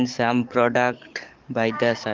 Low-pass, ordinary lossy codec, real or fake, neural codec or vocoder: 7.2 kHz; Opus, 32 kbps; fake; codec, 44.1 kHz, 7.8 kbps, DAC